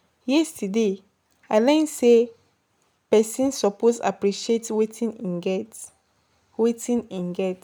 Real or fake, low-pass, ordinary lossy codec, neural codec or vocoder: real; none; none; none